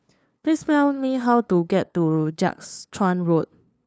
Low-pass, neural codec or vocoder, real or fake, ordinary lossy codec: none; codec, 16 kHz, 2 kbps, FunCodec, trained on LibriTTS, 25 frames a second; fake; none